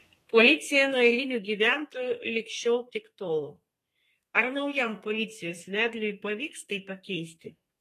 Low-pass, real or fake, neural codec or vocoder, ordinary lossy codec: 14.4 kHz; fake; codec, 32 kHz, 1.9 kbps, SNAC; AAC, 48 kbps